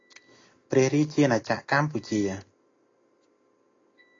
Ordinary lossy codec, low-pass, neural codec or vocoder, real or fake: AAC, 32 kbps; 7.2 kHz; none; real